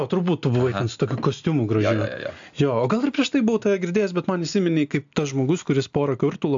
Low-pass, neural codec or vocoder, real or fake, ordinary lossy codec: 7.2 kHz; none; real; AAC, 64 kbps